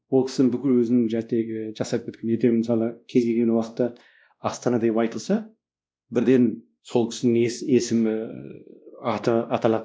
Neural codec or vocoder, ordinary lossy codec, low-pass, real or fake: codec, 16 kHz, 1 kbps, X-Codec, WavLM features, trained on Multilingual LibriSpeech; none; none; fake